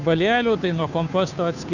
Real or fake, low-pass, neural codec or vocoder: fake; 7.2 kHz; codec, 16 kHz, 2 kbps, FunCodec, trained on Chinese and English, 25 frames a second